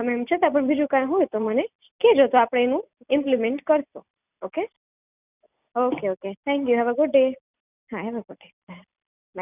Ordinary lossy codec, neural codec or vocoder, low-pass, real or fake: none; none; 3.6 kHz; real